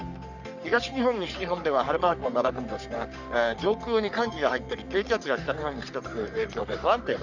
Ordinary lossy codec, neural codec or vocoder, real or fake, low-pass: none; codec, 44.1 kHz, 3.4 kbps, Pupu-Codec; fake; 7.2 kHz